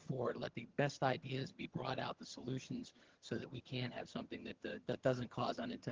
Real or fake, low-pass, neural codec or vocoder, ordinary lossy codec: fake; 7.2 kHz; vocoder, 22.05 kHz, 80 mel bands, HiFi-GAN; Opus, 16 kbps